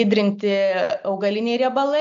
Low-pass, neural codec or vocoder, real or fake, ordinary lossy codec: 7.2 kHz; none; real; MP3, 64 kbps